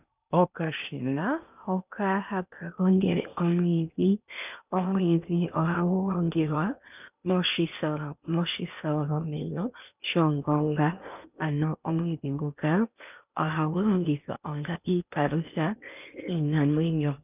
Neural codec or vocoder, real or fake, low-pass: codec, 16 kHz in and 24 kHz out, 0.8 kbps, FocalCodec, streaming, 65536 codes; fake; 3.6 kHz